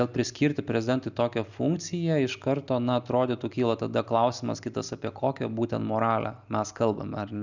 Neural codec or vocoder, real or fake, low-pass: none; real; 7.2 kHz